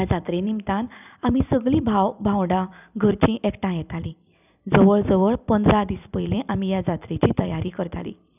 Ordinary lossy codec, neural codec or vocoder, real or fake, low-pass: none; vocoder, 44.1 kHz, 128 mel bands every 256 samples, BigVGAN v2; fake; 3.6 kHz